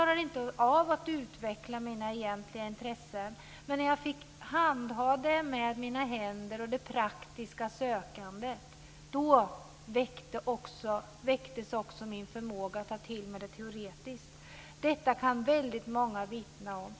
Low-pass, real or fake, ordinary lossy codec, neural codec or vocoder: none; real; none; none